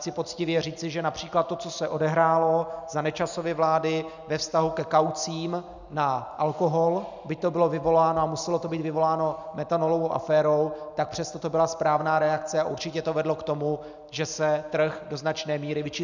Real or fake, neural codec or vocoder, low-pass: real; none; 7.2 kHz